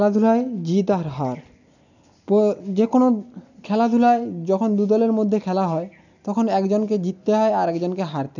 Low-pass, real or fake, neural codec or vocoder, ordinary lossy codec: 7.2 kHz; real; none; none